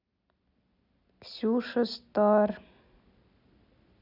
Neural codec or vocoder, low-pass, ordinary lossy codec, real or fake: none; 5.4 kHz; none; real